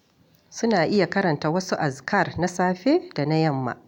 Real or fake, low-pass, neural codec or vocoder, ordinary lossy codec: real; 19.8 kHz; none; none